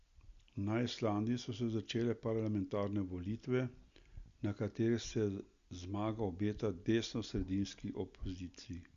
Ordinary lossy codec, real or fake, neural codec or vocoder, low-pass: Opus, 64 kbps; real; none; 7.2 kHz